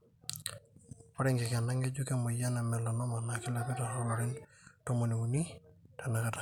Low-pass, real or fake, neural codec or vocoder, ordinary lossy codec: 19.8 kHz; real; none; none